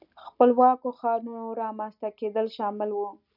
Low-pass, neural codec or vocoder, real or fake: 5.4 kHz; none; real